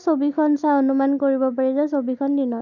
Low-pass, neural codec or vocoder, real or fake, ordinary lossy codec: 7.2 kHz; none; real; none